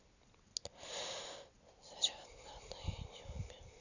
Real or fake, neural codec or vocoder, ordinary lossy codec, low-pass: real; none; none; 7.2 kHz